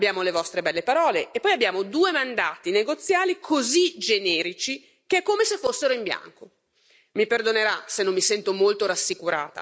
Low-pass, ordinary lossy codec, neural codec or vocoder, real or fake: none; none; none; real